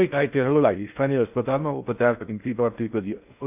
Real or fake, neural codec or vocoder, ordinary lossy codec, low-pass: fake; codec, 16 kHz in and 24 kHz out, 0.6 kbps, FocalCodec, streaming, 4096 codes; none; 3.6 kHz